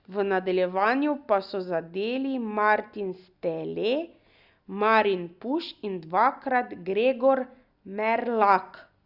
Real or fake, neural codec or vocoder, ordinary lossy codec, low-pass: real; none; Opus, 64 kbps; 5.4 kHz